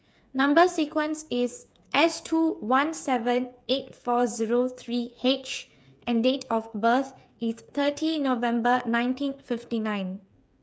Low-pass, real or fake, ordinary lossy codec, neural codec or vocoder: none; fake; none; codec, 16 kHz, 8 kbps, FreqCodec, smaller model